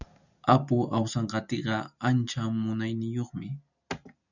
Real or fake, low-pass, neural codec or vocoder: real; 7.2 kHz; none